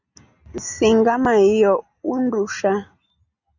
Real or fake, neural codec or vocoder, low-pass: real; none; 7.2 kHz